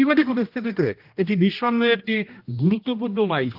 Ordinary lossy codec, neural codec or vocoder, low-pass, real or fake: Opus, 24 kbps; codec, 16 kHz, 1 kbps, X-Codec, HuBERT features, trained on general audio; 5.4 kHz; fake